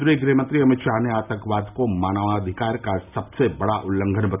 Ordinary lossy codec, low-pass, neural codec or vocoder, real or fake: none; 3.6 kHz; none; real